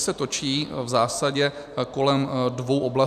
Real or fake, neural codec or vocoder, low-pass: real; none; 14.4 kHz